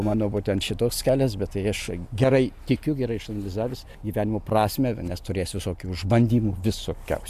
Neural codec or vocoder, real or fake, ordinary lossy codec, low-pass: none; real; AAC, 96 kbps; 14.4 kHz